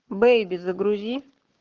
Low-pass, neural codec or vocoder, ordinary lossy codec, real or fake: 7.2 kHz; codec, 44.1 kHz, 7.8 kbps, Pupu-Codec; Opus, 16 kbps; fake